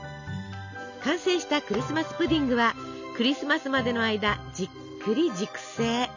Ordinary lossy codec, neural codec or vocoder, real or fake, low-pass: none; none; real; 7.2 kHz